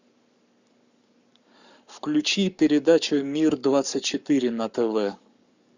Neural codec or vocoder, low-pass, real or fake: codec, 44.1 kHz, 7.8 kbps, Pupu-Codec; 7.2 kHz; fake